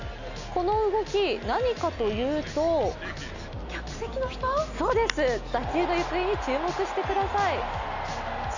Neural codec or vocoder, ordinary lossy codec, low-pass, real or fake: none; none; 7.2 kHz; real